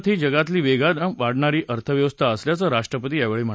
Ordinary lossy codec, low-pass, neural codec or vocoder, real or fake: none; none; none; real